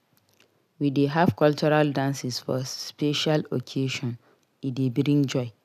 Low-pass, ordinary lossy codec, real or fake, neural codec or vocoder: 14.4 kHz; none; real; none